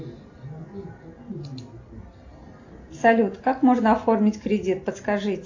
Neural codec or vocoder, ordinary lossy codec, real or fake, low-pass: none; AAC, 48 kbps; real; 7.2 kHz